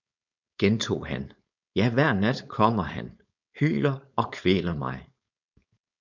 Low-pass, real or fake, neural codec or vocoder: 7.2 kHz; fake; codec, 16 kHz, 4.8 kbps, FACodec